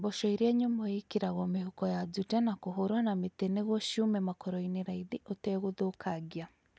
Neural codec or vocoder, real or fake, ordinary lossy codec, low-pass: none; real; none; none